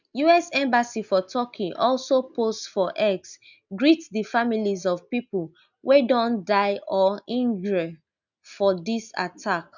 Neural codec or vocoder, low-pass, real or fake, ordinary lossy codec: none; 7.2 kHz; real; none